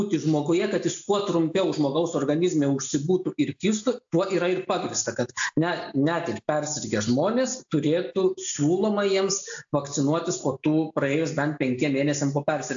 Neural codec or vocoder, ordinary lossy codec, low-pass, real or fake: none; AAC, 64 kbps; 7.2 kHz; real